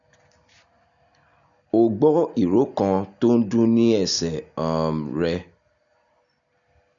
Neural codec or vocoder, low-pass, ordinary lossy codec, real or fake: none; 7.2 kHz; none; real